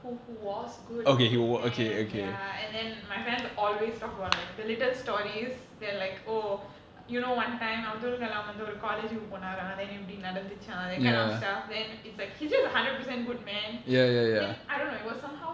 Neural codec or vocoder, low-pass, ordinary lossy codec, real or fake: none; none; none; real